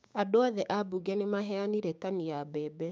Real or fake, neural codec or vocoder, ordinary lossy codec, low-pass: fake; codec, 16 kHz, 6 kbps, DAC; none; none